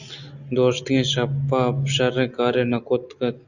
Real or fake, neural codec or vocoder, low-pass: real; none; 7.2 kHz